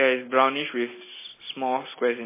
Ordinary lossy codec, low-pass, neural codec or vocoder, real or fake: MP3, 16 kbps; 3.6 kHz; none; real